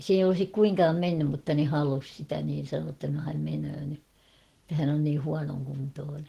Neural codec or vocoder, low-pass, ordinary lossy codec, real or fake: none; 14.4 kHz; Opus, 16 kbps; real